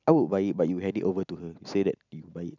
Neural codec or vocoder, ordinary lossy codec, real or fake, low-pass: none; none; real; 7.2 kHz